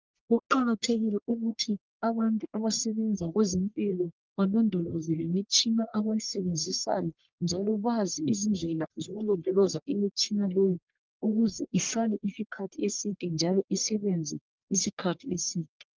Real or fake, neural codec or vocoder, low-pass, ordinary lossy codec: fake; codec, 44.1 kHz, 1.7 kbps, Pupu-Codec; 7.2 kHz; Opus, 32 kbps